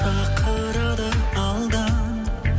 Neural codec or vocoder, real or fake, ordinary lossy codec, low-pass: none; real; none; none